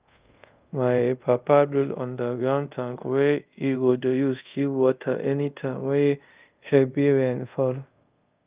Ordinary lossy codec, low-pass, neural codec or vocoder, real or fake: Opus, 24 kbps; 3.6 kHz; codec, 24 kHz, 0.5 kbps, DualCodec; fake